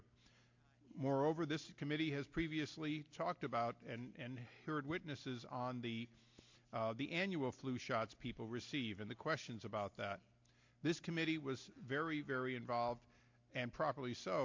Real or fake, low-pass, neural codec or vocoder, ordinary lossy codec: real; 7.2 kHz; none; MP3, 48 kbps